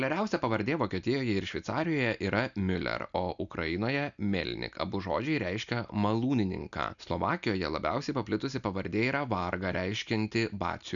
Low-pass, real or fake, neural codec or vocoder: 7.2 kHz; real; none